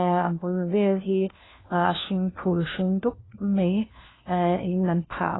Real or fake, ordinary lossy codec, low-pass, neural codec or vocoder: fake; AAC, 16 kbps; 7.2 kHz; codec, 16 kHz, 1 kbps, FunCodec, trained on LibriTTS, 50 frames a second